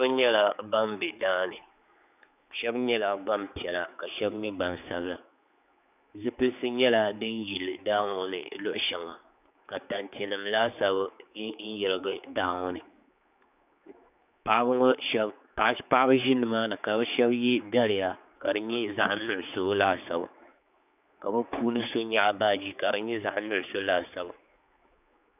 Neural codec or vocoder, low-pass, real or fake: codec, 16 kHz, 4 kbps, X-Codec, HuBERT features, trained on general audio; 3.6 kHz; fake